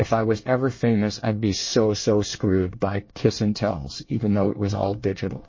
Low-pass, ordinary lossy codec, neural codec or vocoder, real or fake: 7.2 kHz; MP3, 32 kbps; codec, 24 kHz, 1 kbps, SNAC; fake